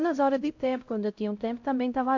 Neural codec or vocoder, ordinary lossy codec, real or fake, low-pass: codec, 16 kHz, 0.5 kbps, X-Codec, HuBERT features, trained on LibriSpeech; MP3, 48 kbps; fake; 7.2 kHz